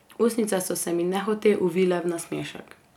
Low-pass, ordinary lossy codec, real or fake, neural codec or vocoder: 19.8 kHz; none; real; none